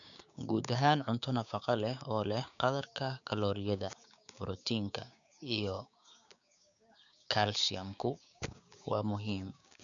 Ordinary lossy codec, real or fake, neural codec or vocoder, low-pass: none; fake; codec, 16 kHz, 6 kbps, DAC; 7.2 kHz